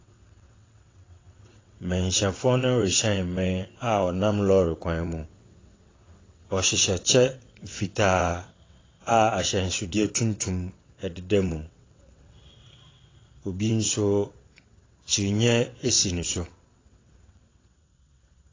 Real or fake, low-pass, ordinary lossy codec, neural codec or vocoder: fake; 7.2 kHz; AAC, 32 kbps; vocoder, 22.05 kHz, 80 mel bands, WaveNeXt